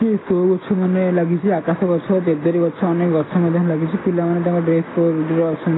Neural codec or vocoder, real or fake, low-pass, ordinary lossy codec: none; real; 7.2 kHz; AAC, 16 kbps